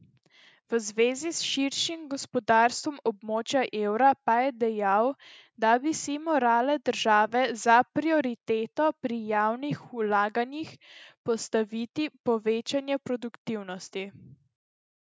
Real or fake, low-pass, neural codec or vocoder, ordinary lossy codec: real; none; none; none